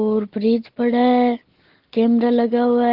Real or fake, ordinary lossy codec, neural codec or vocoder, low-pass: real; Opus, 16 kbps; none; 5.4 kHz